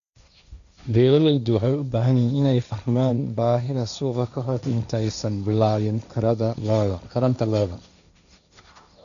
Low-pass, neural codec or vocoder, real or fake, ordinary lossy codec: 7.2 kHz; codec, 16 kHz, 1.1 kbps, Voila-Tokenizer; fake; none